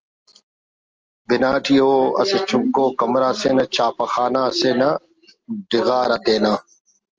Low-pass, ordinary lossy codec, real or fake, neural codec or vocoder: 7.2 kHz; Opus, 24 kbps; real; none